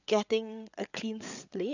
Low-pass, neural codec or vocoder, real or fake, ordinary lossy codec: 7.2 kHz; codec, 16 kHz, 8 kbps, FreqCodec, larger model; fake; none